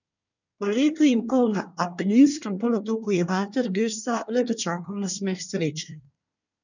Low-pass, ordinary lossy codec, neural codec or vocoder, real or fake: 7.2 kHz; none; codec, 24 kHz, 1 kbps, SNAC; fake